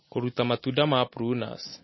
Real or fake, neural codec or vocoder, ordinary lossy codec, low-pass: real; none; MP3, 24 kbps; 7.2 kHz